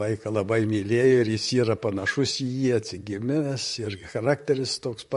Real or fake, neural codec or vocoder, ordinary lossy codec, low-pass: fake; vocoder, 44.1 kHz, 128 mel bands, Pupu-Vocoder; MP3, 48 kbps; 14.4 kHz